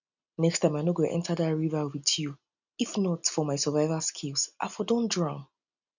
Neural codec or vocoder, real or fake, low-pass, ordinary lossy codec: none; real; 7.2 kHz; none